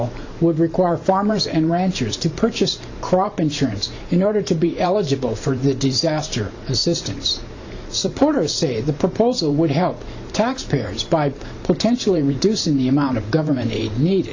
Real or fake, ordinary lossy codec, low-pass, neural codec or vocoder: fake; AAC, 48 kbps; 7.2 kHz; vocoder, 44.1 kHz, 128 mel bands every 512 samples, BigVGAN v2